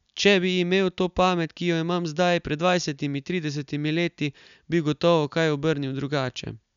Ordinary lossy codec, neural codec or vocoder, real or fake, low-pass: none; none; real; 7.2 kHz